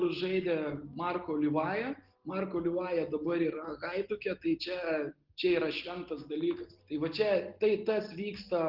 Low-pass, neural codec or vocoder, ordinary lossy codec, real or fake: 5.4 kHz; none; Opus, 16 kbps; real